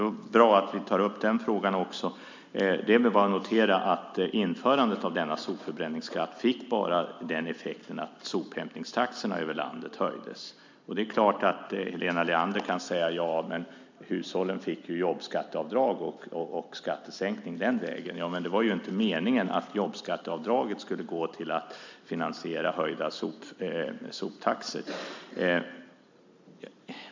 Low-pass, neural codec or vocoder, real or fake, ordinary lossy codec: 7.2 kHz; none; real; MP3, 64 kbps